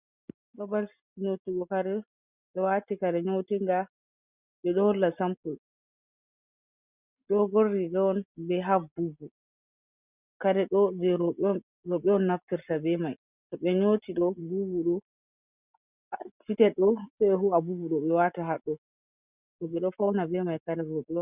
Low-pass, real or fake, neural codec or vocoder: 3.6 kHz; real; none